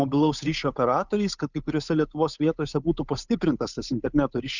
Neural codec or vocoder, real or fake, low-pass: codec, 16 kHz, 8 kbps, FunCodec, trained on Chinese and English, 25 frames a second; fake; 7.2 kHz